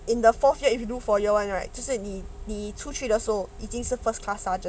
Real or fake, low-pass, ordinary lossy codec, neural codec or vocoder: real; none; none; none